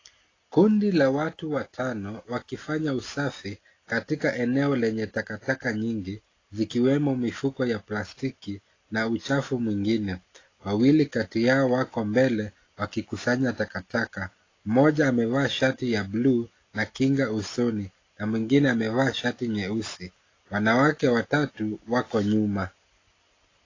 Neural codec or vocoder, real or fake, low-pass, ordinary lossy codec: none; real; 7.2 kHz; AAC, 32 kbps